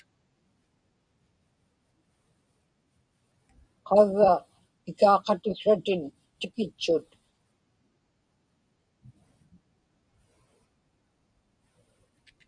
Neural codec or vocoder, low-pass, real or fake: vocoder, 44.1 kHz, 128 mel bands every 256 samples, BigVGAN v2; 9.9 kHz; fake